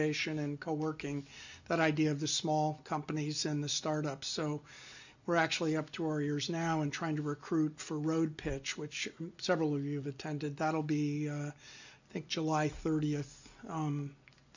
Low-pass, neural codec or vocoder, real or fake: 7.2 kHz; none; real